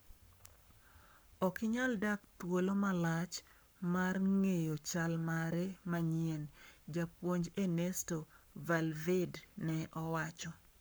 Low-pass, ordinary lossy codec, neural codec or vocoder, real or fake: none; none; codec, 44.1 kHz, 7.8 kbps, Pupu-Codec; fake